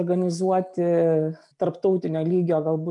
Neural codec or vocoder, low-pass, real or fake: none; 10.8 kHz; real